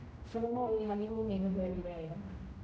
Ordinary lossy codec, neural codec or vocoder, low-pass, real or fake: none; codec, 16 kHz, 0.5 kbps, X-Codec, HuBERT features, trained on general audio; none; fake